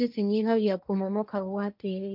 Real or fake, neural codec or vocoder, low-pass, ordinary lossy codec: fake; codec, 16 kHz, 1.1 kbps, Voila-Tokenizer; 5.4 kHz; none